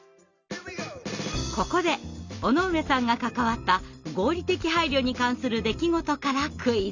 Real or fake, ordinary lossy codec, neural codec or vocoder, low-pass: real; none; none; 7.2 kHz